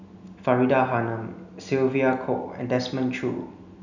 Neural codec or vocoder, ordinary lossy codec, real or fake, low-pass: none; none; real; 7.2 kHz